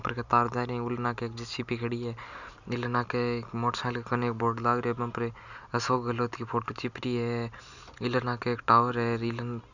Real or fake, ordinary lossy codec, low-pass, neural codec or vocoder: real; none; 7.2 kHz; none